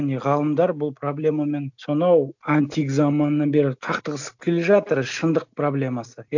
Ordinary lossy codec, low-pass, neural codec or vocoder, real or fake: none; 7.2 kHz; none; real